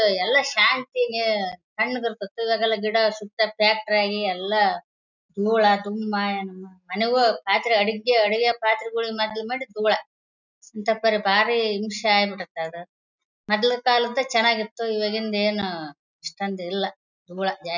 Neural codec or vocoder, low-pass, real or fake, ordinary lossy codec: none; 7.2 kHz; real; none